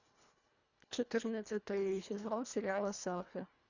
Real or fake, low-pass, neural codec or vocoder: fake; 7.2 kHz; codec, 24 kHz, 1.5 kbps, HILCodec